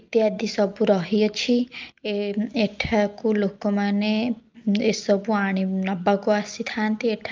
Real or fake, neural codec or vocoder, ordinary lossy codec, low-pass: real; none; Opus, 24 kbps; 7.2 kHz